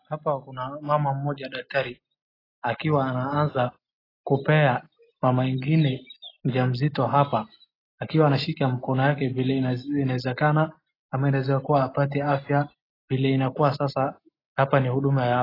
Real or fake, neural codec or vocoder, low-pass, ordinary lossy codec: real; none; 5.4 kHz; AAC, 24 kbps